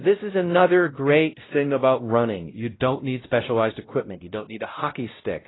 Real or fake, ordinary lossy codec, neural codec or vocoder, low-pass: fake; AAC, 16 kbps; codec, 16 kHz, 0.5 kbps, X-Codec, WavLM features, trained on Multilingual LibriSpeech; 7.2 kHz